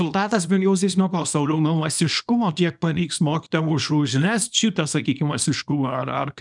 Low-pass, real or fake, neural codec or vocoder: 10.8 kHz; fake; codec, 24 kHz, 0.9 kbps, WavTokenizer, small release